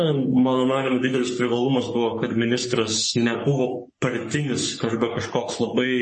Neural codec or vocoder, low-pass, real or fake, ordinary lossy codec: codec, 44.1 kHz, 3.4 kbps, Pupu-Codec; 10.8 kHz; fake; MP3, 32 kbps